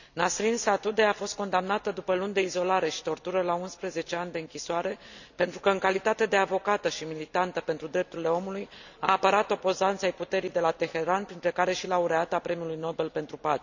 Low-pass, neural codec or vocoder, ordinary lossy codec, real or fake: 7.2 kHz; none; none; real